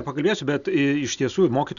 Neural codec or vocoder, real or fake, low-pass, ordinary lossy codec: none; real; 7.2 kHz; MP3, 96 kbps